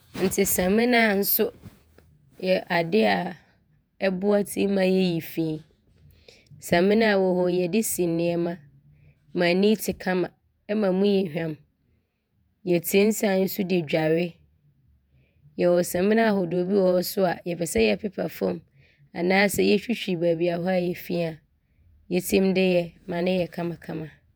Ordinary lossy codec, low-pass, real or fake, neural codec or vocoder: none; none; fake; vocoder, 48 kHz, 128 mel bands, Vocos